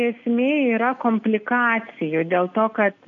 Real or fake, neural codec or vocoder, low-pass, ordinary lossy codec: real; none; 7.2 kHz; AAC, 64 kbps